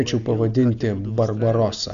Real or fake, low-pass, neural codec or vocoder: real; 7.2 kHz; none